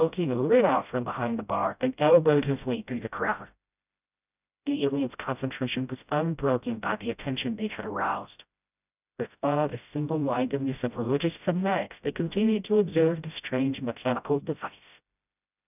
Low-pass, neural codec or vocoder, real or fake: 3.6 kHz; codec, 16 kHz, 0.5 kbps, FreqCodec, smaller model; fake